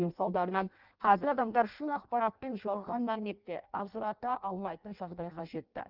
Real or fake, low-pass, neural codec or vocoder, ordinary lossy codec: fake; 5.4 kHz; codec, 16 kHz in and 24 kHz out, 0.6 kbps, FireRedTTS-2 codec; Opus, 24 kbps